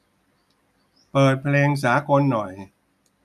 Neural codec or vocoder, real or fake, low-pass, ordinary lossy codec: none; real; 14.4 kHz; none